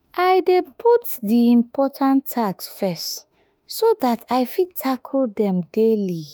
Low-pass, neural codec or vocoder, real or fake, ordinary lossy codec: none; autoencoder, 48 kHz, 32 numbers a frame, DAC-VAE, trained on Japanese speech; fake; none